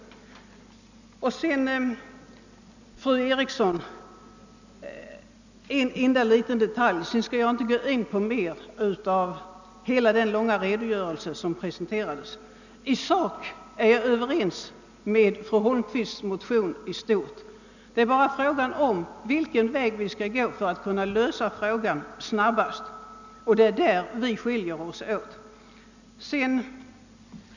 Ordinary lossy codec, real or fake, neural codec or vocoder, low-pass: none; real; none; 7.2 kHz